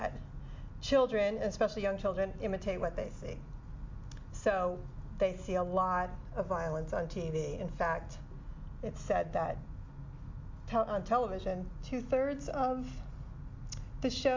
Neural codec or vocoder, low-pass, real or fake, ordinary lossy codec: none; 7.2 kHz; real; MP3, 48 kbps